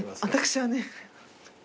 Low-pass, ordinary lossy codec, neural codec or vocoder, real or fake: none; none; none; real